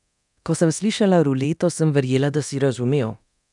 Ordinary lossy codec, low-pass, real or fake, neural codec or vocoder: none; 10.8 kHz; fake; codec, 24 kHz, 0.9 kbps, DualCodec